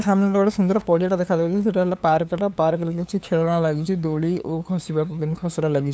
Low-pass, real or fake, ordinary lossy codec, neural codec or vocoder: none; fake; none; codec, 16 kHz, 2 kbps, FunCodec, trained on LibriTTS, 25 frames a second